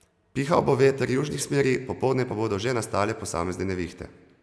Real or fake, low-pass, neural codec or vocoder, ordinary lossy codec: real; none; none; none